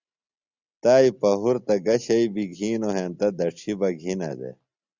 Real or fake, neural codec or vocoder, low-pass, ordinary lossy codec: real; none; 7.2 kHz; Opus, 64 kbps